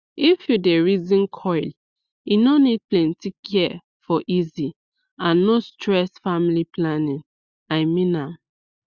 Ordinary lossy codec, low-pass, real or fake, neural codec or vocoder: Opus, 64 kbps; 7.2 kHz; real; none